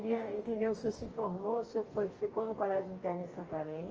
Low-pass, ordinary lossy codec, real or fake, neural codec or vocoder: 7.2 kHz; Opus, 24 kbps; fake; codec, 44.1 kHz, 2.6 kbps, DAC